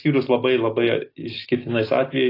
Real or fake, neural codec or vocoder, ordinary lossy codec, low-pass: real; none; AAC, 24 kbps; 5.4 kHz